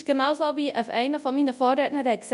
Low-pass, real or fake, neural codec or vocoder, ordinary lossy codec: 10.8 kHz; fake; codec, 24 kHz, 0.9 kbps, WavTokenizer, large speech release; none